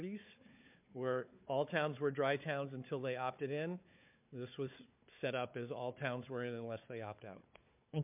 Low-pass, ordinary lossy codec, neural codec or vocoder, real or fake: 3.6 kHz; MP3, 32 kbps; codec, 16 kHz, 4 kbps, FunCodec, trained on Chinese and English, 50 frames a second; fake